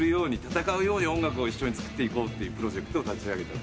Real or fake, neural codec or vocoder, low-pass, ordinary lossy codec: real; none; none; none